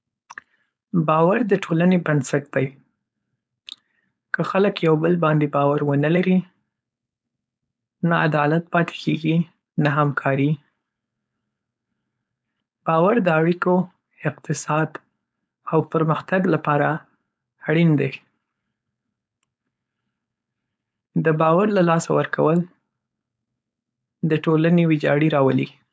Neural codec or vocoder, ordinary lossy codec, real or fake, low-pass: codec, 16 kHz, 4.8 kbps, FACodec; none; fake; none